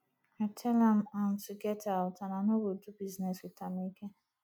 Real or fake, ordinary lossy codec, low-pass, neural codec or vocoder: real; none; 19.8 kHz; none